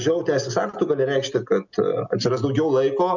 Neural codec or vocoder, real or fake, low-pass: none; real; 7.2 kHz